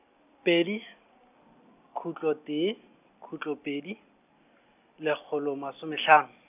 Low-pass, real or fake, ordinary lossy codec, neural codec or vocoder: 3.6 kHz; real; none; none